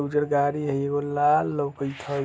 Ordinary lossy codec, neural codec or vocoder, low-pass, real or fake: none; none; none; real